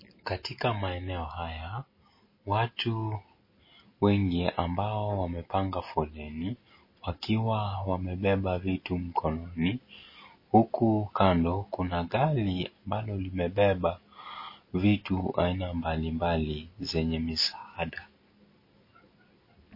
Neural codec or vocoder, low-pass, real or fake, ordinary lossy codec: none; 5.4 kHz; real; MP3, 24 kbps